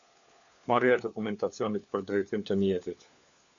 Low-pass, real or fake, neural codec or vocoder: 7.2 kHz; fake; codec, 16 kHz, 4 kbps, FunCodec, trained on LibriTTS, 50 frames a second